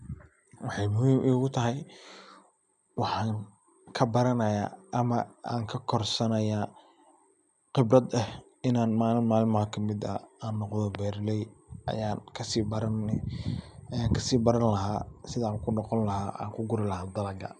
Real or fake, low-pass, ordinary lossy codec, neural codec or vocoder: real; 10.8 kHz; none; none